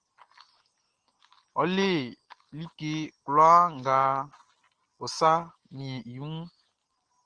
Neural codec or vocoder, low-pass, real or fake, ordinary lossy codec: none; 9.9 kHz; real; Opus, 16 kbps